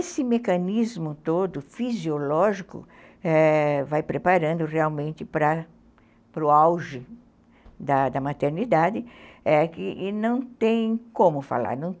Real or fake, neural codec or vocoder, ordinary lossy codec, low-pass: real; none; none; none